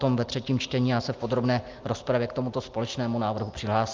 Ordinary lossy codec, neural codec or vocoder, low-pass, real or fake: Opus, 32 kbps; none; 7.2 kHz; real